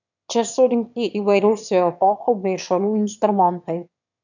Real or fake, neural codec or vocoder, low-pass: fake; autoencoder, 22.05 kHz, a latent of 192 numbers a frame, VITS, trained on one speaker; 7.2 kHz